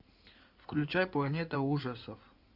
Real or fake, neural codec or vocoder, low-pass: fake; codec, 16 kHz in and 24 kHz out, 2.2 kbps, FireRedTTS-2 codec; 5.4 kHz